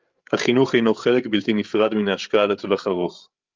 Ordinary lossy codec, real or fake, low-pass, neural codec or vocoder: Opus, 24 kbps; fake; 7.2 kHz; codec, 16 kHz, 8 kbps, FreqCodec, larger model